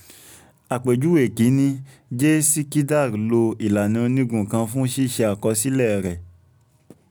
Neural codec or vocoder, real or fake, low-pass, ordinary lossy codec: none; real; none; none